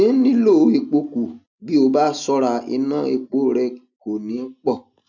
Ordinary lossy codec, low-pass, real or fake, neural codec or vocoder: none; 7.2 kHz; real; none